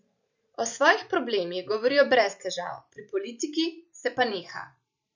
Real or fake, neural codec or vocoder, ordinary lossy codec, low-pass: fake; vocoder, 44.1 kHz, 128 mel bands every 512 samples, BigVGAN v2; none; 7.2 kHz